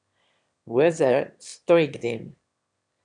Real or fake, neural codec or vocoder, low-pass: fake; autoencoder, 22.05 kHz, a latent of 192 numbers a frame, VITS, trained on one speaker; 9.9 kHz